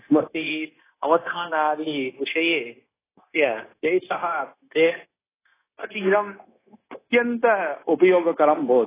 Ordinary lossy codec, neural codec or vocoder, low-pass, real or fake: AAC, 16 kbps; codec, 16 kHz, 0.9 kbps, LongCat-Audio-Codec; 3.6 kHz; fake